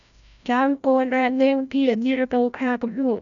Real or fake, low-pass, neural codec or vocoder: fake; 7.2 kHz; codec, 16 kHz, 0.5 kbps, FreqCodec, larger model